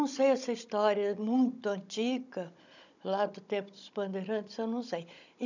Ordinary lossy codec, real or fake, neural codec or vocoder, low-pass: none; fake; codec, 16 kHz, 16 kbps, FunCodec, trained on Chinese and English, 50 frames a second; 7.2 kHz